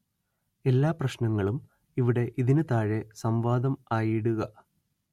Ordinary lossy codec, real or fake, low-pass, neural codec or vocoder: MP3, 64 kbps; real; 19.8 kHz; none